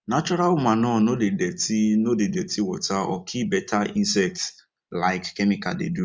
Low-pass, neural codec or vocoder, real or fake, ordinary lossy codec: none; none; real; none